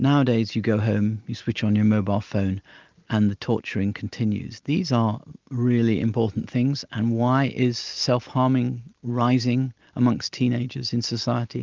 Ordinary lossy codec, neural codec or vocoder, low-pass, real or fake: Opus, 24 kbps; none; 7.2 kHz; real